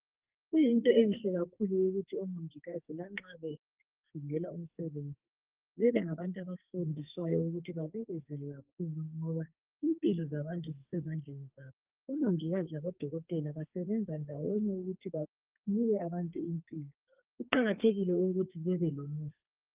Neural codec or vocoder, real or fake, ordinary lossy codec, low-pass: codec, 44.1 kHz, 2.6 kbps, SNAC; fake; Opus, 32 kbps; 3.6 kHz